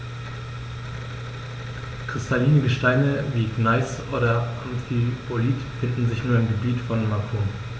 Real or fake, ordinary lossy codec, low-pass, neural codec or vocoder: real; none; none; none